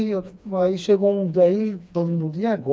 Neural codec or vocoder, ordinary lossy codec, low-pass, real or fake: codec, 16 kHz, 2 kbps, FreqCodec, smaller model; none; none; fake